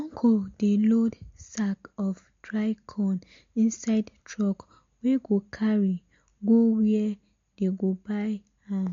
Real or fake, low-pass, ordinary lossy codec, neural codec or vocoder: real; 7.2 kHz; MP3, 48 kbps; none